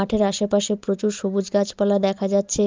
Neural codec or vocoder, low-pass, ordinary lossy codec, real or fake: none; 7.2 kHz; Opus, 24 kbps; real